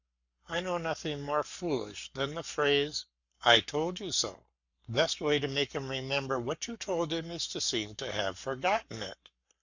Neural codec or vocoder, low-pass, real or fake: codec, 44.1 kHz, 7.8 kbps, Pupu-Codec; 7.2 kHz; fake